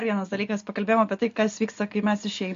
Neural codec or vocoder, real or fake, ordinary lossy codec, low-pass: none; real; MP3, 48 kbps; 7.2 kHz